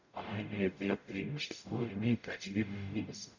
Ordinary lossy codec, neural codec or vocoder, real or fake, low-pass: none; codec, 44.1 kHz, 0.9 kbps, DAC; fake; 7.2 kHz